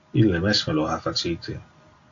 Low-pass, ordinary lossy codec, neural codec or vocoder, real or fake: 7.2 kHz; AAC, 48 kbps; none; real